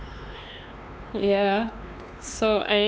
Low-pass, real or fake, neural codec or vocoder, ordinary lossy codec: none; fake; codec, 16 kHz, 2 kbps, X-Codec, WavLM features, trained on Multilingual LibriSpeech; none